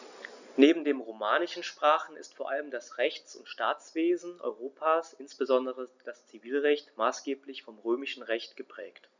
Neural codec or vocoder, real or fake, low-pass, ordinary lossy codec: none; real; 7.2 kHz; none